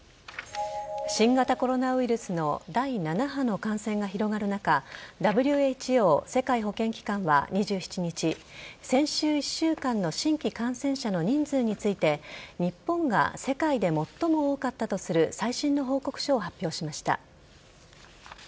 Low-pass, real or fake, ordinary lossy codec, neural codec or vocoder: none; real; none; none